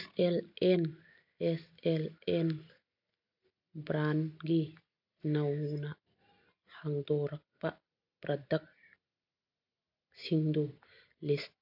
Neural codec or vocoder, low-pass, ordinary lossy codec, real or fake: none; 5.4 kHz; AAC, 48 kbps; real